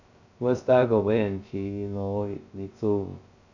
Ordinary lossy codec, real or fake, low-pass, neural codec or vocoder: none; fake; 7.2 kHz; codec, 16 kHz, 0.2 kbps, FocalCodec